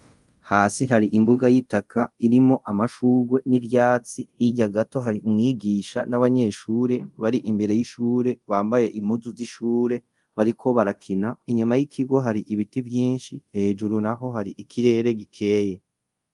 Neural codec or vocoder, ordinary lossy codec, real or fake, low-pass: codec, 24 kHz, 0.5 kbps, DualCodec; Opus, 32 kbps; fake; 10.8 kHz